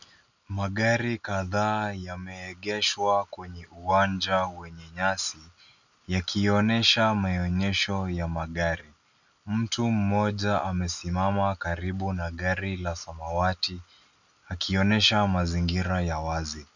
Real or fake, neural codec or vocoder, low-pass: real; none; 7.2 kHz